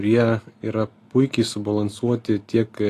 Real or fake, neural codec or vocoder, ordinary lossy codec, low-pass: real; none; AAC, 48 kbps; 14.4 kHz